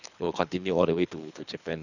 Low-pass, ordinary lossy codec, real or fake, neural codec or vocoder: 7.2 kHz; none; fake; codec, 24 kHz, 3 kbps, HILCodec